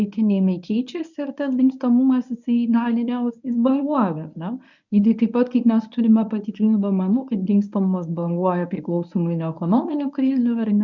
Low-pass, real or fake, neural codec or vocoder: 7.2 kHz; fake; codec, 24 kHz, 0.9 kbps, WavTokenizer, medium speech release version 1